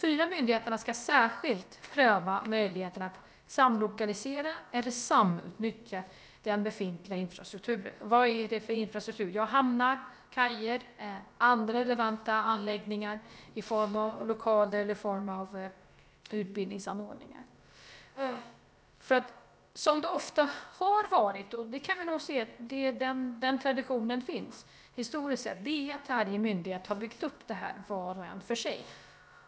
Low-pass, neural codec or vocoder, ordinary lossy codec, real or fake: none; codec, 16 kHz, about 1 kbps, DyCAST, with the encoder's durations; none; fake